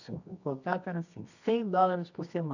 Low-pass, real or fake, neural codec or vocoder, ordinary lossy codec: 7.2 kHz; fake; codec, 24 kHz, 0.9 kbps, WavTokenizer, medium music audio release; none